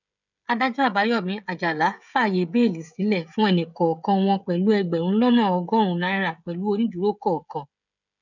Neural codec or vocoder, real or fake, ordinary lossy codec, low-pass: codec, 16 kHz, 16 kbps, FreqCodec, smaller model; fake; none; 7.2 kHz